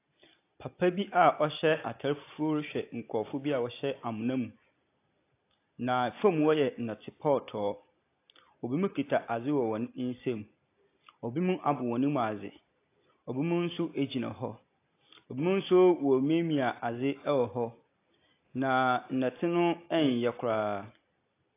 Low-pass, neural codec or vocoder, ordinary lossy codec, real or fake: 3.6 kHz; none; AAC, 24 kbps; real